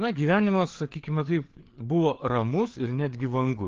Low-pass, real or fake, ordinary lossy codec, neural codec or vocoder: 7.2 kHz; fake; Opus, 16 kbps; codec, 16 kHz, 4 kbps, FreqCodec, larger model